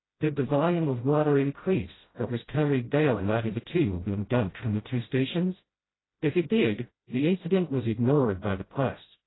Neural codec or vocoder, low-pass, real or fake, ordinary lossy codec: codec, 16 kHz, 0.5 kbps, FreqCodec, smaller model; 7.2 kHz; fake; AAC, 16 kbps